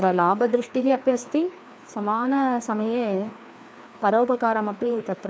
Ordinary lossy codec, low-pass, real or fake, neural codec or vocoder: none; none; fake; codec, 16 kHz, 2 kbps, FreqCodec, larger model